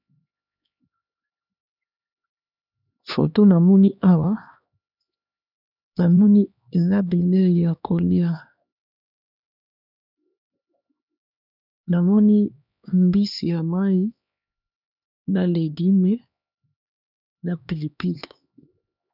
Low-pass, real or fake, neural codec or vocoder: 5.4 kHz; fake; codec, 16 kHz, 2 kbps, X-Codec, HuBERT features, trained on LibriSpeech